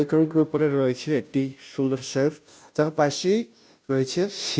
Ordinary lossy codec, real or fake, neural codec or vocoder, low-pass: none; fake; codec, 16 kHz, 0.5 kbps, FunCodec, trained on Chinese and English, 25 frames a second; none